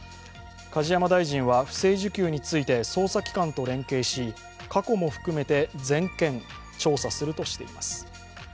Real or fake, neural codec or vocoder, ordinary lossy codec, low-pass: real; none; none; none